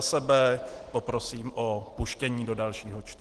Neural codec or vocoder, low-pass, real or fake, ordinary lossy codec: none; 10.8 kHz; real; Opus, 16 kbps